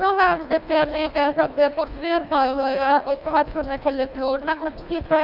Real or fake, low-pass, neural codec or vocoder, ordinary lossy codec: fake; 5.4 kHz; codec, 24 kHz, 1.5 kbps, HILCodec; none